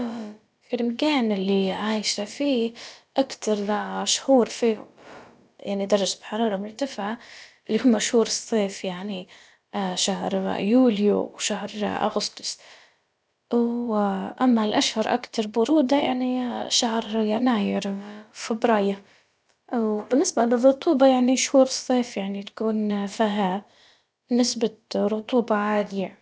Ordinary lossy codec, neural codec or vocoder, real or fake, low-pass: none; codec, 16 kHz, about 1 kbps, DyCAST, with the encoder's durations; fake; none